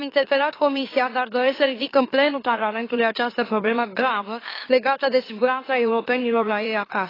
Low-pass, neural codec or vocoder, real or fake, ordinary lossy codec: 5.4 kHz; autoencoder, 44.1 kHz, a latent of 192 numbers a frame, MeloTTS; fake; AAC, 24 kbps